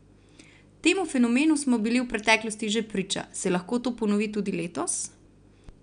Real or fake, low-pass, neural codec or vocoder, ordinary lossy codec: real; 9.9 kHz; none; none